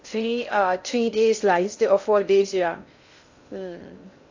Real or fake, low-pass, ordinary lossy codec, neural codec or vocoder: fake; 7.2 kHz; AAC, 48 kbps; codec, 16 kHz in and 24 kHz out, 0.6 kbps, FocalCodec, streaming, 2048 codes